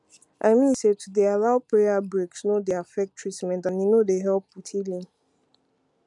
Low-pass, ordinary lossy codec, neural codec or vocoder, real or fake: 10.8 kHz; MP3, 96 kbps; none; real